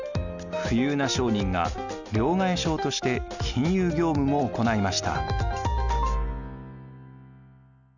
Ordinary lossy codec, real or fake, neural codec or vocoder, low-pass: none; real; none; 7.2 kHz